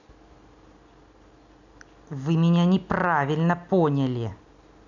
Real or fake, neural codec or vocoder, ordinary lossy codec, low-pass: real; none; none; 7.2 kHz